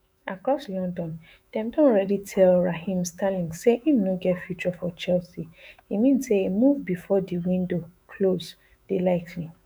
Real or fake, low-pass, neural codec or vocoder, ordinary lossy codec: fake; none; autoencoder, 48 kHz, 128 numbers a frame, DAC-VAE, trained on Japanese speech; none